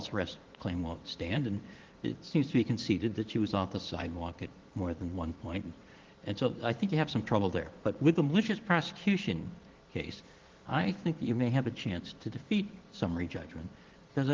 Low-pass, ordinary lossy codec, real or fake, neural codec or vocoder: 7.2 kHz; Opus, 16 kbps; fake; vocoder, 44.1 kHz, 80 mel bands, Vocos